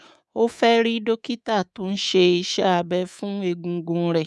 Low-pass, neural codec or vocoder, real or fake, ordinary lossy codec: none; codec, 24 kHz, 3.1 kbps, DualCodec; fake; none